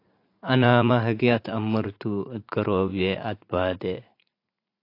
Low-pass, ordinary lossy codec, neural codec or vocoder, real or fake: 5.4 kHz; AAC, 32 kbps; vocoder, 44.1 kHz, 80 mel bands, Vocos; fake